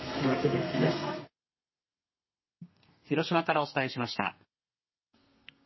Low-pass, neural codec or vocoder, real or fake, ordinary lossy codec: 7.2 kHz; codec, 32 kHz, 1.9 kbps, SNAC; fake; MP3, 24 kbps